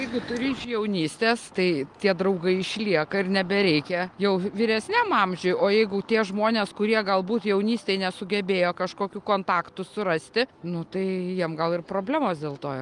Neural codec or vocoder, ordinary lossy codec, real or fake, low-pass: none; Opus, 32 kbps; real; 10.8 kHz